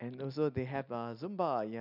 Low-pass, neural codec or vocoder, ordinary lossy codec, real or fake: 5.4 kHz; none; none; real